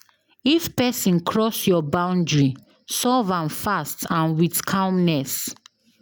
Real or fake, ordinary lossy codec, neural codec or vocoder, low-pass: real; none; none; none